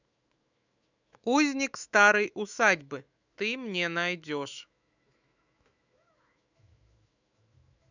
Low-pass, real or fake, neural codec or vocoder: 7.2 kHz; fake; autoencoder, 48 kHz, 128 numbers a frame, DAC-VAE, trained on Japanese speech